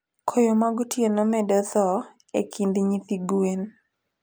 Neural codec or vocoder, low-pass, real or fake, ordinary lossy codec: none; none; real; none